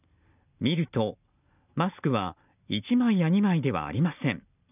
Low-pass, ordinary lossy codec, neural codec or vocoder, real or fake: 3.6 kHz; none; none; real